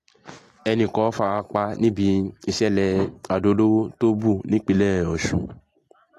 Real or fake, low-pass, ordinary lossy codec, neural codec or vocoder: real; 14.4 kHz; AAC, 48 kbps; none